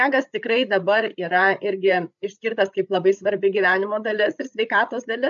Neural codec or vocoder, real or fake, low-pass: codec, 16 kHz, 8 kbps, FreqCodec, larger model; fake; 7.2 kHz